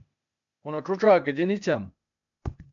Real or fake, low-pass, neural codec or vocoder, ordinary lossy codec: fake; 7.2 kHz; codec, 16 kHz, 0.8 kbps, ZipCodec; MP3, 64 kbps